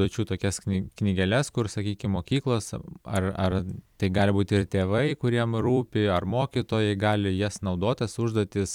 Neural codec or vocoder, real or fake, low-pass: vocoder, 44.1 kHz, 128 mel bands every 256 samples, BigVGAN v2; fake; 19.8 kHz